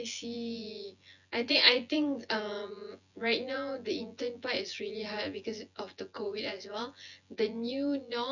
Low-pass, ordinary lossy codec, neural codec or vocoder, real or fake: 7.2 kHz; none; vocoder, 24 kHz, 100 mel bands, Vocos; fake